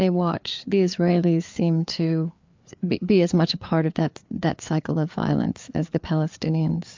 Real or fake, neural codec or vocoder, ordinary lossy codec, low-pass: fake; codec, 16 kHz, 4 kbps, FreqCodec, larger model; MP3, 64 kbps; 7.2 kHz